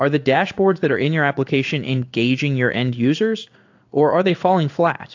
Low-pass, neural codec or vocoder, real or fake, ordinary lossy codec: 7.2 kHz; codec, 16 kHz in and 24 kHz out, 1 kbps, XY-Tokenizer; fake; AAC, 48 kbps